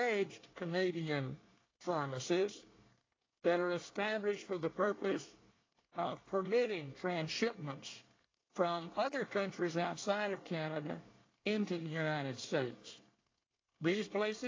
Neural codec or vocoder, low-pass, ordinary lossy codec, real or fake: codec, 24 kHz, 1 kbps, SNAC; 7.2 kHz; AAC, 32 kbps; fake